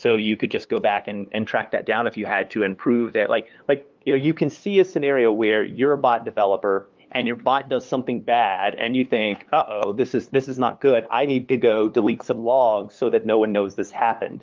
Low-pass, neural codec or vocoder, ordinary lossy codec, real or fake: 7.2 kHz; codec, 16 kHz, 2 kbps, FunCodec, trained on LibriTTS, 25 frames a second; Opus, 32 kbps; fake